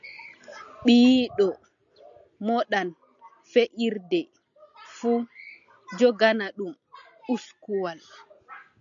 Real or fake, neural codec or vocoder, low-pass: real; none; 7.2 kHz